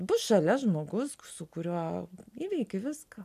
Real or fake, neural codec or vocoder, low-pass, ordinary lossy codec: real; none; 14.4 kHz; AAC, 96 kbps